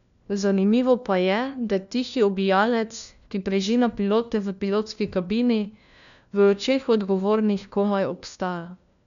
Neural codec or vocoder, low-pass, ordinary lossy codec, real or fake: codec, 16 kHz, 1 kbps, FunCodec, trained on LibriTTS, 50 frames a second; 7.2 kHz; none; fake